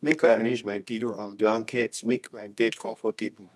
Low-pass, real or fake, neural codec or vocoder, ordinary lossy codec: none; fake; codec, 24 kHz, 0.9 kbps, WavTokenizer, medium music audio release; none